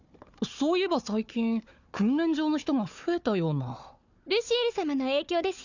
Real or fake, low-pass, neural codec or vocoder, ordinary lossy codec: fake; 7.2 kHz; codec, 16 kHz, 4 kbps, FunCodec, trained on Chinese and English, 50 frames a second; none